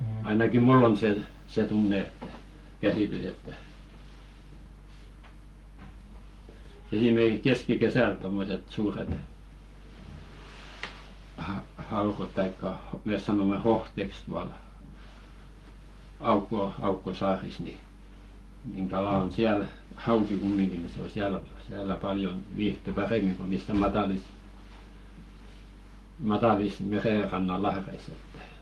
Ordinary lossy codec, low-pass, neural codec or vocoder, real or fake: Opus, 32 kbps; 19.8 kHz; codec, 44.1 kHz, 7.8 kbps, Pupu-Codec; fake